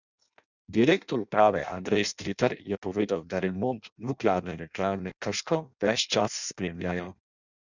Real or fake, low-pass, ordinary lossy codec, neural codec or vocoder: fake; 7.2 kHz; none; codec, 16 kHz in and 24 kHz out, 0.6 kbps, FireRedTTS-2 codec